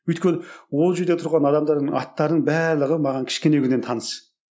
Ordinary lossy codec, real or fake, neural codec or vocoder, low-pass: none; real; none; none